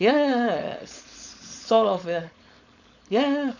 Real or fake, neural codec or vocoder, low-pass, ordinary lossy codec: fake; codec, 16 kHz, 4.8 kbps, FACodec; 7.2 kHz; none